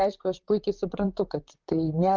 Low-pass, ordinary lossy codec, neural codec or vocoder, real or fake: 7.2 kHz; Opus, 32 kbps; vocoder, 44.1 kHz, 128 mel bands, Pupu-Vocoder; fake